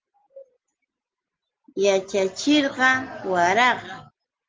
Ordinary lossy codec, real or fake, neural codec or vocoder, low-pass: Opus, 16 kbps; real; none; 7.2 kHz